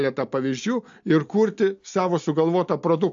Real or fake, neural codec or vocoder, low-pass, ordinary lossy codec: real; none; 7.2 kHz; AAC, 64 kbps